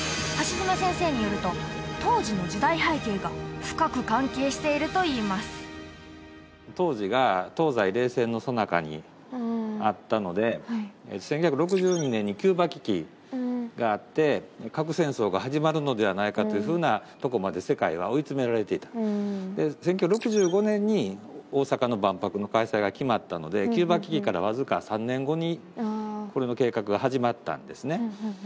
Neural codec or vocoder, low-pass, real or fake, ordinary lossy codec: none; none; real; none